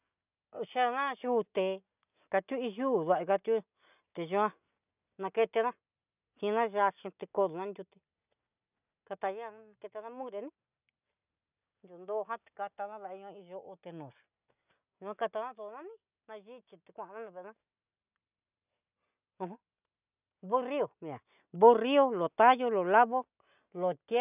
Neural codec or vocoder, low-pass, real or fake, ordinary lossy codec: none; 3.6 kHz; real; none